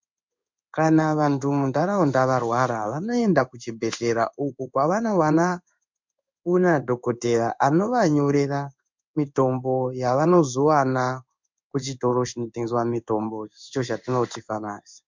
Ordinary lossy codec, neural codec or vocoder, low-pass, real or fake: MP3, 64 kbps; codec, 16 kHz in and 24 kHz out, 1 kbps, XY-Tokenizer; 7.2 kHz; fake